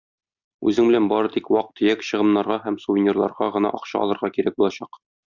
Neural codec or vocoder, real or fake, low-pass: none; real; 7.2 kHz